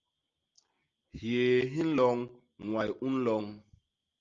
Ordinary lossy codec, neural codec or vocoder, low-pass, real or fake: Opus, 32 kbps; none; 7.2 kHz; real